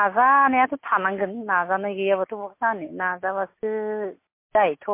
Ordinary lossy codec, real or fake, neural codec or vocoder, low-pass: MP3, 24 kbps; real; none; 3.6 kHz